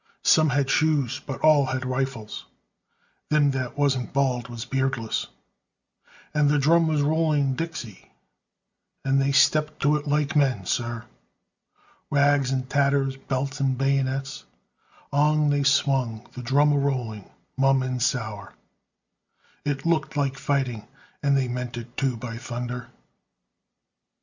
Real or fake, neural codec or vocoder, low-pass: real; none; 7.2 kHz